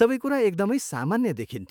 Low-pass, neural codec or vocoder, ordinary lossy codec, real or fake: none; autoencoder, 48 kHz, 128 numbers a frame, DAC-VAE, trained on Japanese speech; none; fake